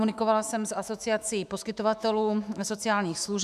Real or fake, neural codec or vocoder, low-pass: fake; autoencoder, 48 kHz, 128 numbers a frame, DAC-VAE, trained on Japanese speech; 14.4 kHz